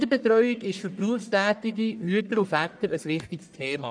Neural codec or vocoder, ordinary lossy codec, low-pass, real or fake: codec, 44.1 kHz, 1.7 kbps, Pupu-Codec; none; 9.9 kHz; fake